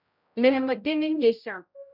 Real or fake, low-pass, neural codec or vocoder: fake; 5.4 kHz; codec, 16 kHz, 0.5 kbps, X-Codec, HuBERT features, trained on general audio